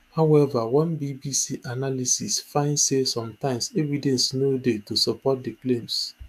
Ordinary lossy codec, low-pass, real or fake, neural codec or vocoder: none; 14.4 kHz; fake; autoencoder, 48 kHz, 128 numbers a frame, DAC-VAE, trained on Japanese speech